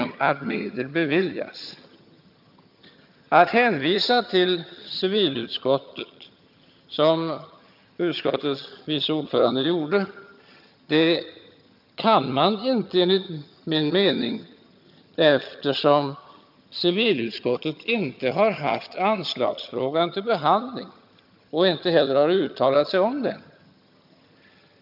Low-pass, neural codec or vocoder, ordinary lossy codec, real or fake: 5.4 kHz; vocoder, 22.05 kHz, 80 mel bands, HiFi-GAN; none; fake